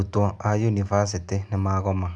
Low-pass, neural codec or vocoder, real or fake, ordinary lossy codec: 9.9 kHz; none; real; none